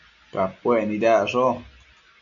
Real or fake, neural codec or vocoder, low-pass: real; none; 7.2 kHz